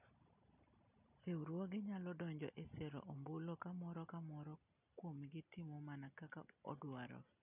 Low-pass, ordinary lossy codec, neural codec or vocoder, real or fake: 3.6 kHz; none; none; real